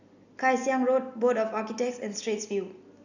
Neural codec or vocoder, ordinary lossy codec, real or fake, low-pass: none; none; real; 7.2 kHz